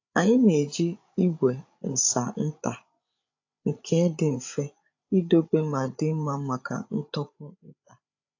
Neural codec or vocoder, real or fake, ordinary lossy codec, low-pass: codec, 16 kHz, 8 kbps, FreqCodec, larger model; fake; AAC, 48 kbps; 7.2 kHz